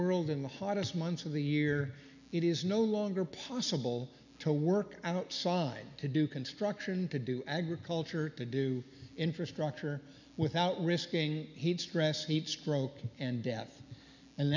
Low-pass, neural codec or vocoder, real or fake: 7.2 kHz; none; real